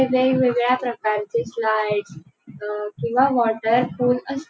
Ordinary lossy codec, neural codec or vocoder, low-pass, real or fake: none; none; none; real